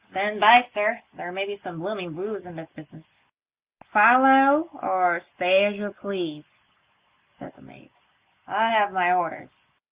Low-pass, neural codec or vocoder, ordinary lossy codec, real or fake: 3.6 kHz; none; Opus, 64 kbps; real